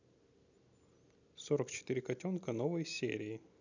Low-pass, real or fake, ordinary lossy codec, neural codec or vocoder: 7.2 kHz; real; none; none